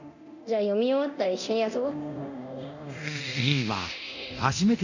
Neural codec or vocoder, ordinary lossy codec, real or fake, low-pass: codec, 24 kHz, 0.9 kbps, DualCodec; none; fake; 7.2 kHz